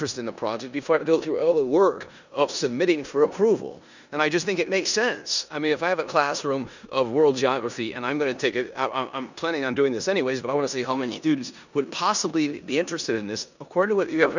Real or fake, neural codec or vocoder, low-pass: fake; codec, 16 kHz in and 24 kHz out, 0.9 kbps, LongCat-Audio-Codec, four codebook decoder; 7.2 kHz